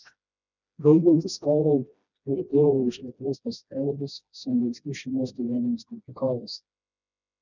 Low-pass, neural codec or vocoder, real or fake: 7.2 kHz; codec, 16 kHz, 1 kbps, FreqCodec, smaller model; fake